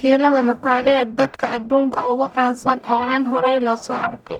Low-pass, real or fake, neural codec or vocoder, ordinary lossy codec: 19.8 kHz; fake; codec, 44.1 kHz, 0.9 kbps, DAC; none